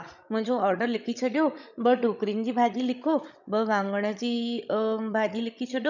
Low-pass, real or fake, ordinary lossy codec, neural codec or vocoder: 7.2 kHz; fake; none; codec, 16 kHz, 16 kbps, FreqCodec, larger model